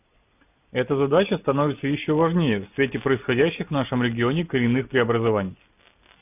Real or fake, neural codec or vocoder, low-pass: real; none; 3.6 kHz